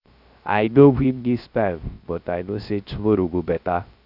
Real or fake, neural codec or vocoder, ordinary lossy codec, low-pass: fake; codec, 16 kHz, 0.3 kbps, FocalCodec; none; 5.4 kHz